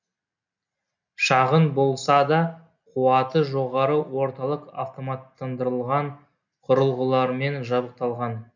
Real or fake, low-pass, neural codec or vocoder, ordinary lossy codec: real; 7.2 kHz; none; none